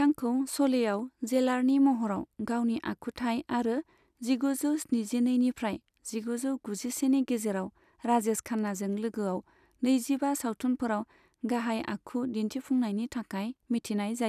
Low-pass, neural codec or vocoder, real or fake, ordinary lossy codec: 14.4 kHz; none; real; none